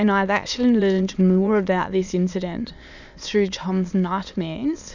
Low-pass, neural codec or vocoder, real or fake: 7.2 kHz; autoencoder, 22.05 kHz, a latent of 192 numbers a frame, VITS, trained on many speakers; fake